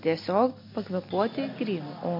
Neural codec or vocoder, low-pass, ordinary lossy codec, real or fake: none; 5.4 kHz; MP3, 32 kbps; real